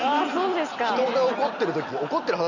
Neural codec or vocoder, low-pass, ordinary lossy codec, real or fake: none; 7.2 kHz; none; real